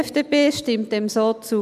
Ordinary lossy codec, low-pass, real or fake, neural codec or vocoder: none; 14.4 kHz; real; none